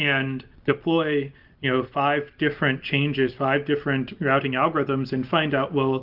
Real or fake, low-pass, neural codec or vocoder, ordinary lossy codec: real; 5.4 kHz; none; Opus, 32 kbps